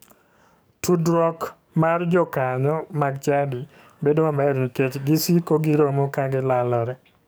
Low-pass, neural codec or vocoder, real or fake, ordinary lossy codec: none; codec, 44.1 kHz, 7.8 kbps, DAC; fake; none